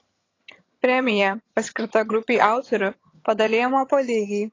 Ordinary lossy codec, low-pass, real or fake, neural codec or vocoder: AAC, 32 kbps; 7.2 kHz; fake; vocoder, 22.05 kHz, 80 mel bands, HiFi-GAN